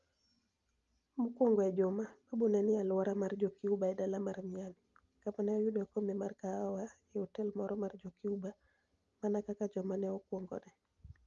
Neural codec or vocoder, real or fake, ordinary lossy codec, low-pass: none; real; Opus, 32 kbps; 7.2 kHz